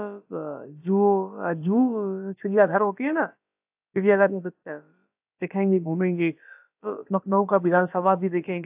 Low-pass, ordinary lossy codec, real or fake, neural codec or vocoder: 3.6 kHz; AAC, 32 kbps; fake; codec, 16 kHz, about 1 kbps, DyCAST, with the encoder's durations